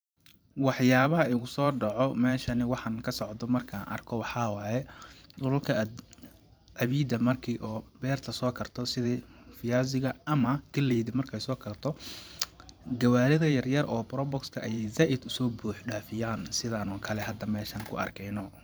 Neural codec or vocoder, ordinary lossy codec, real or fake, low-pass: vocoder, 44.1 kHz, 128 mel bands every 512 samples, BigVGAN v2; none; fake; none